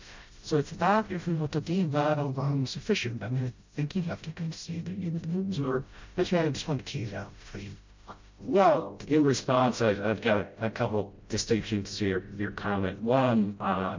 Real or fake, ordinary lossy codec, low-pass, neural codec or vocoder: fake; MP3, 48 kbps; 7.2 kHz; codec, 16 kHz, 0.5 kbps, FreqCodec, smaller model